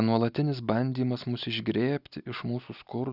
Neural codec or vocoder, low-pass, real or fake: none; 5.4 kHz; real